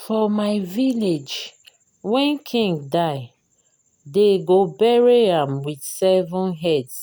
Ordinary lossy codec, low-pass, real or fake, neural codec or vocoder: none; none; real; none